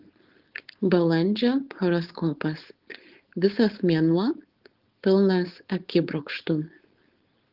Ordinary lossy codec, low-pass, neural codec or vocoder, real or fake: Opus, 16 kbps; 5.4 kHz; codec, 16 kHz, 4.8 kbps, FACodec; fake